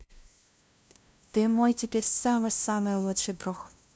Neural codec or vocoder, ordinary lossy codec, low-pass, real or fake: codec, 16 kHz, 0.5 kbps, FunCodec, trained on LibriTTS, 25 frames a second; none; none; fake